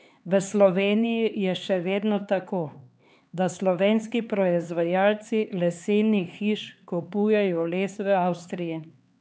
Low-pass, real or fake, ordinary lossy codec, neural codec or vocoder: none; fake; none; codec, 16 kHz, 4 kbps, X-Codec, HuBERT features, trained on LibriSpeech